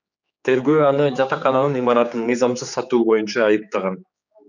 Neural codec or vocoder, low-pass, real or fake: codec, 16 kHz, 4 kbps, X-Codec, HuBERT features, trained on general audio; 7.2 kHz; fake